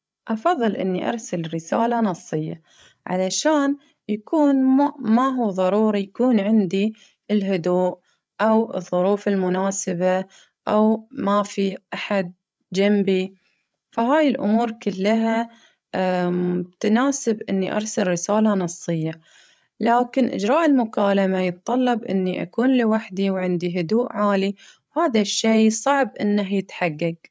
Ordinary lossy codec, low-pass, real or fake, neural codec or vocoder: none; none; fake; codec, 16 kHz, 8 kbps, FreqCodec, larger model